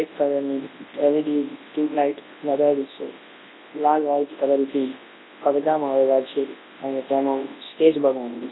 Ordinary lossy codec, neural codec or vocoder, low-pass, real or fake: AAC, 16 kbps; codec, 24 kHz, 0.9 kbps, WavTokenizer, large speech release; 7.2 kHz; fake